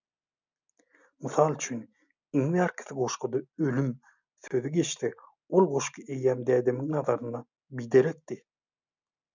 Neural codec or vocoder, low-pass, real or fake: none; 7.2 kHz; real